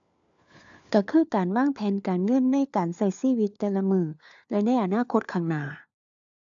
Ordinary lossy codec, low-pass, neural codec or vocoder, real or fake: AAC, 64 kbps; 7.2 kHz; codec, 16 kHz, 4 kbps, FunCodec, trained on LibriTTS, 50 frames a second; fake